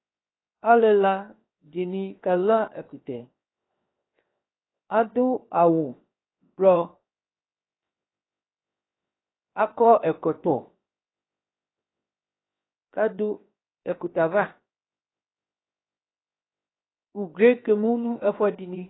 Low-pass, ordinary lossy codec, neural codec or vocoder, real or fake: 7.2 kHz; AAC, 16 kbps; codec, 16 kHz, 0.7 kbps, FocalCodec; fake